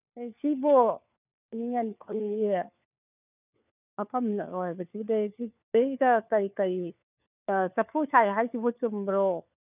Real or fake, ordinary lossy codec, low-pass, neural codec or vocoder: fake; none; 3.6 kHz; codec, 16 kHz, 4 kbps, FunCodec, trained on LibriTTS, 50 frames a second